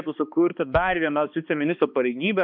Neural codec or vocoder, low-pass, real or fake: codec, 16 kHz, 2 kbps, X-Codec, HuBERT features, trained on balanced general audio; 5.4 kHz; fake